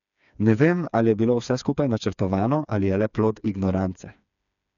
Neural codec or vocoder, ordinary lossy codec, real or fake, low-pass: codec, 16 kHz, 4 kbps, FreqCodec, smaller model; none; fake; 7.2 kHz